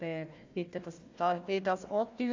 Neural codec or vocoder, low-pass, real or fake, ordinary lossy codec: codec, 16 kHz, 1 kbps, FunCodec, trained on Chinese and English, 50 frames a second; 7.2 kHz; fake; AAC, 48 kbps